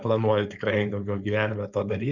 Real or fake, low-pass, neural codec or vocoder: fake; 7.2 kHz; codec, 16 kHz, 4.8 kbps, FACodec